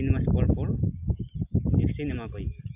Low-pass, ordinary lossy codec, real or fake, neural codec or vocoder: 3.6 kHz; AAC, 32 kbps; real; none